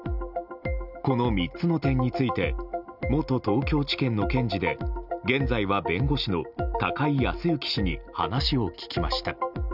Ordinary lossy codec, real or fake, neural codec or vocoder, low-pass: none; real; none; 5.4 kHz